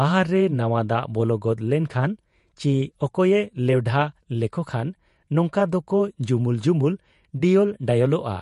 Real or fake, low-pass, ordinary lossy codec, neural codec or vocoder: fake; 14.4 kHz; MP3, 48 kbps; vocoder, 48 kHz, 128 mel bands, Vocos